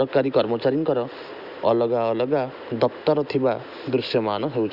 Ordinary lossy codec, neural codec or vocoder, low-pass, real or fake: none; none; 5.4 kHz; real